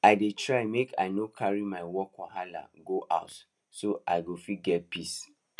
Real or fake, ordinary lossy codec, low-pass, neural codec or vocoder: real; none; none; none